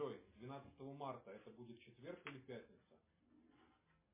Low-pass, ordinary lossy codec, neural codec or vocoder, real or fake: 3.6 kHz; MP3, 16 kbps; none; real